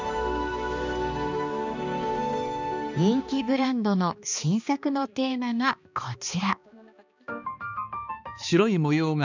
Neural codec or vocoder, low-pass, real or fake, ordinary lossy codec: codec, 16 kHz, 2 kbps, X-Codec, HuBERT features, trained on balanced general audio; 7.2 kHz; fake; none